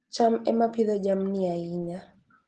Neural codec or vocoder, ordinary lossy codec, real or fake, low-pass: none; Opus, 16 kbps; real; 10.8 kHz